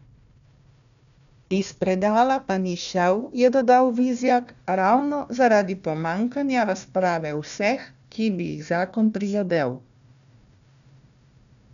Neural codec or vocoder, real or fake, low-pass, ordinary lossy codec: codec, 16 kHz, 1 kbps, FunCodec, trained on Chinese and English, 50 frames a second; fake; 7.2 kHz; none